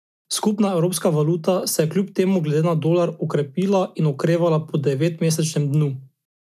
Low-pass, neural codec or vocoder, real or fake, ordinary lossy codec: 14.4 kHz; none; real; none